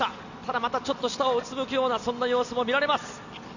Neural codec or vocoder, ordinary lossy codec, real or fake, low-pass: none; none; real; 7.2 kHz